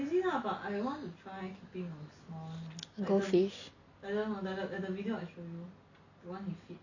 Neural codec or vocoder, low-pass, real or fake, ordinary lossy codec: none; 7.2 kHz; real; none